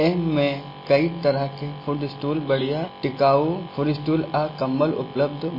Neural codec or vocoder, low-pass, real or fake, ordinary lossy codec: none; 5.4 kHz; real; MP3, 24 kbps